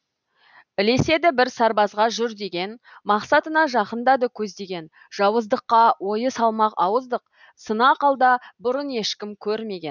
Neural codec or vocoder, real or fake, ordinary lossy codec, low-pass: none; real; none; 7.2 kHz